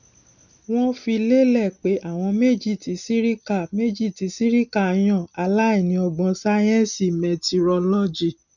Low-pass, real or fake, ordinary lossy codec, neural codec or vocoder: 7.2 kHz; real; none; none